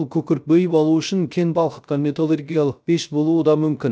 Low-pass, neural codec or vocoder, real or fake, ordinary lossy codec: none; codec, 16 kHz, 0.3 kbps, FocalCodec; fake; none